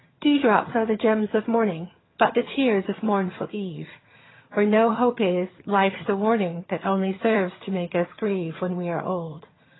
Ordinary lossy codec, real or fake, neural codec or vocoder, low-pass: AAC, 16 kbps; fake; vocoder, 22.05 kHz, 80 mel bands, HiFi-GAN; 7.2 kHz